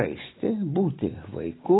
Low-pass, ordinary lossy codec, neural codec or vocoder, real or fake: 7.2 kHz; AAC, 16 kbps; none; real